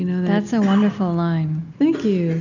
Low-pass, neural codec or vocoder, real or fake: 7.2 kHz; none; real